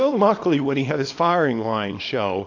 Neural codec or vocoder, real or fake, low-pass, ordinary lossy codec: codec, 24 kHz, 0.9 kbps, WavTokenizer, small release; fake; 7.2 kHz; AAC, 48 kbps